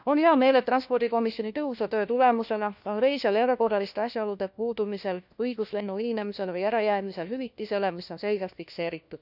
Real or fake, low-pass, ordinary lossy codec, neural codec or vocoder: fake; 5.4 kHz; none; codec, 16 kHz, 1 kbps, FunCodec, trained on LibriTTS, 50 frames a second